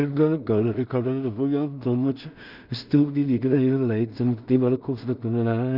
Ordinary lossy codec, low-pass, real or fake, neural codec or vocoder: none; 5.4 kHz; fake; codec, 16 kHz in and 24 kHz out, 0.4 kbps, LongCat-Audio-Codec, two codebook decoder